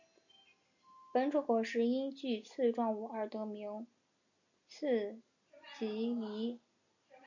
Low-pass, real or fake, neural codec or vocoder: 7.2 kHz; real; none